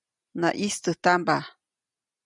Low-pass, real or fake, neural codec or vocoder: 10.8 kHz; real; none